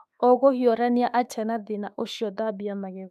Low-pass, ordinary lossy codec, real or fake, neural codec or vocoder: 14.4 kHz; none; fake; autoencoder, 48 kHz, 32 numbers a frame, DAC-VAE, trained on Japanese speech